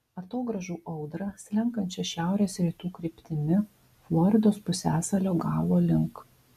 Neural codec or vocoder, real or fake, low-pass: none; real; 14.4 kHz